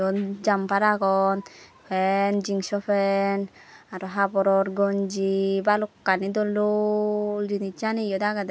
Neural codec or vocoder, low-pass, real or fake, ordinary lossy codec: none; none; real; none